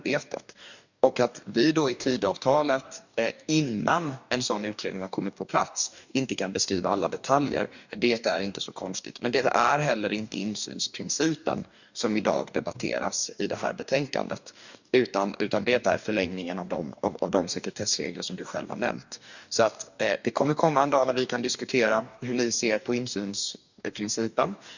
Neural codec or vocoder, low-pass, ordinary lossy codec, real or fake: codec, 44.1 kHz, 2.6 kbps, DAC; 7.2 kHz; none; fake